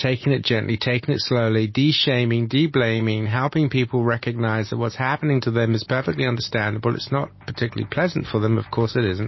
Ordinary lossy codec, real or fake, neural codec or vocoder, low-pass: MP3, 24 kbps; real; none; 7.2 kHz